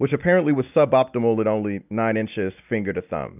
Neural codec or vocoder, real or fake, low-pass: none; real; 3.6 kHz